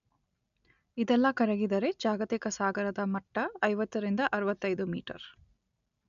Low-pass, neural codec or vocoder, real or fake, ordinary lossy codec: 7.2 kHz; none; real; none